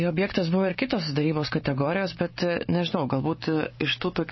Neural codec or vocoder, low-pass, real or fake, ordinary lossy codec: codec, 16 kHz, 6 kbps, DAC; 7.2 kHz; fake; MP3, 24 kbps